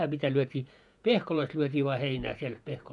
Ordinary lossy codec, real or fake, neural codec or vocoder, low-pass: none; fake; vocoder, 48 kHz, 128 mel bands, Vocos; 10.8 kHz